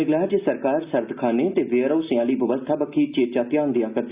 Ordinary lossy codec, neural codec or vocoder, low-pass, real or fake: Opus, 64 kbps; none; 3.6 kHz; real